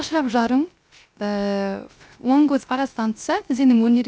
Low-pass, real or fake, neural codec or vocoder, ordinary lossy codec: none; fake; codec, 16 kHz, 0.3 kbps, FocalCodec; none